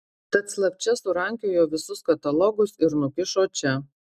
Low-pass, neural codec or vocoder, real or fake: 14.4 kHz; none; real